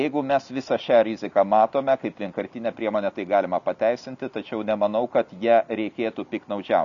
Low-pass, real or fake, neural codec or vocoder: 7.2 kHz; real; none